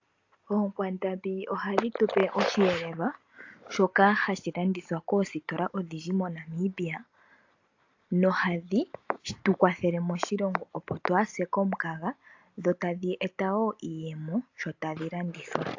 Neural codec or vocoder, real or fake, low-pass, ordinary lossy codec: none; real; 7.2 kHz; AAC, 48 kbps